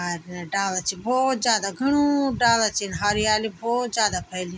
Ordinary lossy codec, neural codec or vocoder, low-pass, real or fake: none; none; none; real